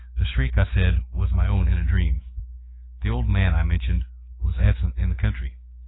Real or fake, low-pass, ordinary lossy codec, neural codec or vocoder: fake; 7.2 kHz; AAC, 16 kbps; codec, 16 kHz, 6 kbps, DAC